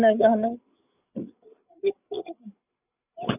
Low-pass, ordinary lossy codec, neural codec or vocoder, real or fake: 3.6 kHz; none; codec, 16 kHz, 8 kbps, FreqCodec, larger model; fake